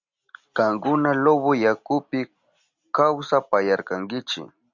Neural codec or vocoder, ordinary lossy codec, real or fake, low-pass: none; Opus, 64 kbps; real; 7.2 kHz